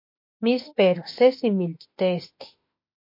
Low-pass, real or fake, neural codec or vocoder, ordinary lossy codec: 5.4 kHz; fake; autoencoder, 48 kHz, 32 numbers a frame, DAC-VAE, trained on Japanese speech; MP3, 32 kbps